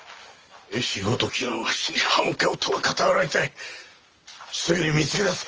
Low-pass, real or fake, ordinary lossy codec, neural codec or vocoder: 7.2 kHz; real; Opus, 24 kbps; none